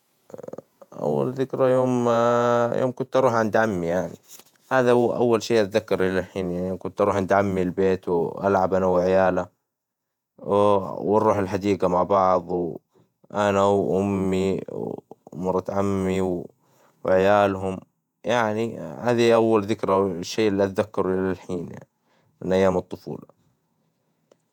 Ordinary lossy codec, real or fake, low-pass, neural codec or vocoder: none; fake; 19.8 kHz; vocoder, 48 kHz, 128 mel bands, Vocos